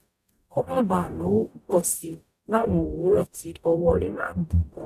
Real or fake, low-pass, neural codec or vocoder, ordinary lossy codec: fake; 14.4 kHz; codec, 44.1 kHz, 0.9 kbps, DAC; none